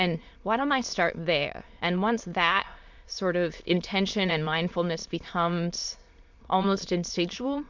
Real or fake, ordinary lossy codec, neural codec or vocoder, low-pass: fake; MP3, 64 kbps; autoencoder, 22.05 kHz, a latent of 192 numbers a frame, VITS, trained on many speakers; 7.2 kHz